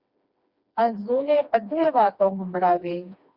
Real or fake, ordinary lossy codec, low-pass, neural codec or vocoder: fake; Opus, 64 kbps; 5.4 kHz; codec, 16 kHz, 2 kbps, FreqCodec, smaller model